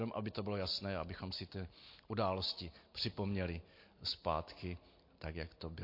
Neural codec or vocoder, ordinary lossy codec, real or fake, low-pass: none; MP3, 32 kbps; real; 5.4 kHz